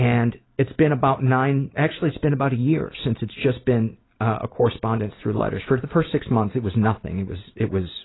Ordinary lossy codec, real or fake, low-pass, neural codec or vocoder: AAC, 16 kbps; real; 7.2 kHz; none